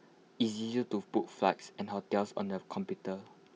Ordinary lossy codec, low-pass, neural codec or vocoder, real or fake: none; none; none; real